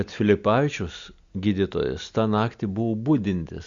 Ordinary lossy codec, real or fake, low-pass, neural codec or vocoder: Opus, 64 kbps; real; 7.2 kHz; none